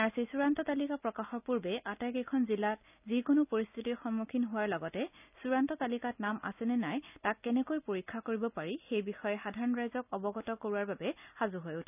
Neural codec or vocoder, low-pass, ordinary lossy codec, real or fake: none; 3.6 kHz; none; real